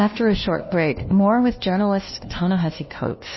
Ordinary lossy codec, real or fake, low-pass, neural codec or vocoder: MP3, 24 kbps; fake; 7.2 kHz; codec, 16 kHz, 1 kbps, FunCodec, trained on LibriTTS, 50 frames a second